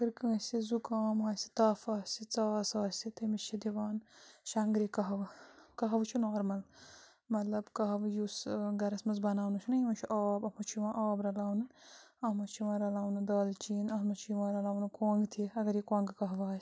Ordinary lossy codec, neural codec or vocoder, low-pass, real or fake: none; none; none; real